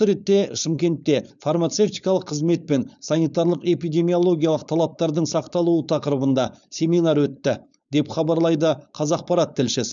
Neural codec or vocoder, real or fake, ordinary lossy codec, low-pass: codec, 16 kHz, 4.8 kbps, FACodec; fake; none; 7.2 kHz